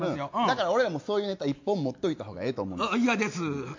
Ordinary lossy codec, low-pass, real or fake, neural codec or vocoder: MP3, 48 kbps; 7.2 kHz; real; none